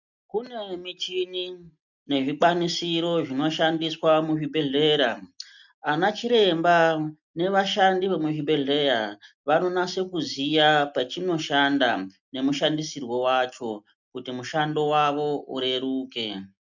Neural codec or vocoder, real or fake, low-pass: none; real; 7.2 kHz